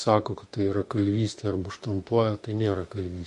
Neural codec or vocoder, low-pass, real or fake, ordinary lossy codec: autoencoder, 48 kHz, 32 numbers a frame, DAC-VAE, trained on Japanese speech; 14.4 kHz; fake; MP3, 48 kbps